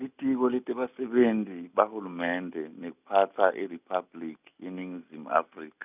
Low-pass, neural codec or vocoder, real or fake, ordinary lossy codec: 3.6 kHz; none; real; none